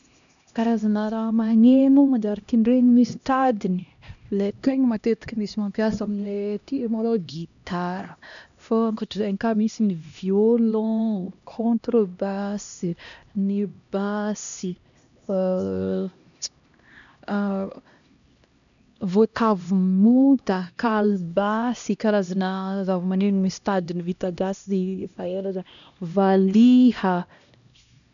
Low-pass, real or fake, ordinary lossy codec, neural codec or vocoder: 7.2 kHz; fake; none; codec, 16 kHz, 1 kbps, X-Codec, HuBERT features, trained on LibriSpeech